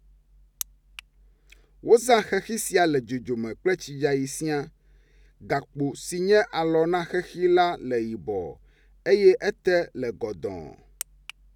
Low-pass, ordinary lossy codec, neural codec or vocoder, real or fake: 19.8 kHz; none; none; real